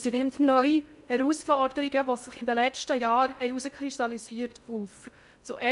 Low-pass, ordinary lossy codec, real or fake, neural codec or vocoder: 10.8 kHz; none; fake; codec, 16 kHz in and 24 kHz out, 0.6 kbps, FocalCodec, streaming, 2048 codes